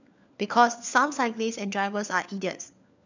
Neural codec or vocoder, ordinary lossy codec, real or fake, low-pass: codec, 16 kHz, 4 kbps, FunCodec, trained on LibriTTS, 50 frames a second; none; fake; 7.2 kHz